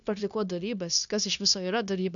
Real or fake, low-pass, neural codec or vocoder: fake; 7.2 kHz; codec, 16 kHz, 0.9 kbps, LongCat-Audio-Codec